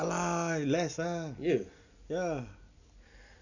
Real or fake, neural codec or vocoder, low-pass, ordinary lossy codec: real; none; 7.2 kHz; none